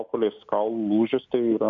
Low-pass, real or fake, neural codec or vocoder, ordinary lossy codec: 7.2 kHz; real; none; MP3, 48 kbps